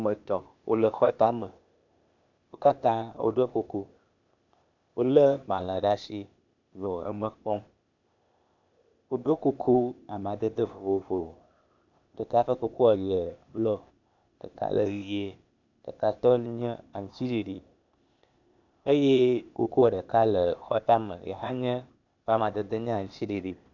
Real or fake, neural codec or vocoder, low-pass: fake; codec, 16 kHz, 0.8 kbps, ZipCodec; 7.2 kHz